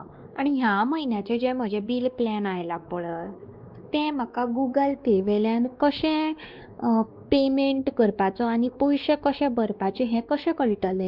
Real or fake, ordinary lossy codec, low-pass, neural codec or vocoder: fake; Opus, 32 kbps; 5.4 kHz; codec, 16 kHz, 2 kbps, X-Codec, WavLM features, trained on Multilingual LibriSpeech